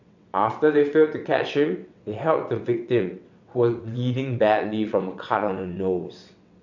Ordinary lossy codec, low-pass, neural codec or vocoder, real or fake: none; 7.2 kHz; vocoder, 22.05 kHz, 80 mel bands, WaveNeXt; fake